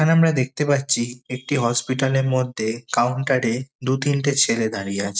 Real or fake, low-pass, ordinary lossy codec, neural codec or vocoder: real; none; none; none